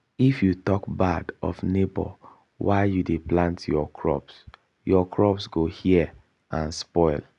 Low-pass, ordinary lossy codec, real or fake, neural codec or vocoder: 10.8 kHz; none; real; none